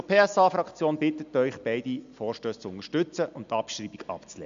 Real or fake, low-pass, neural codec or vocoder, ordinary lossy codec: real; 7.2 kHz; none; MP3, 64 kbps